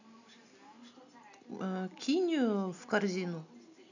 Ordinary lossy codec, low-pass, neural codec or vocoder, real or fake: none; 7.2 kHz; none; real